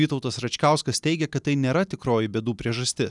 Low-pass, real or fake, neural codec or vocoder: 10.8 kHz; real; none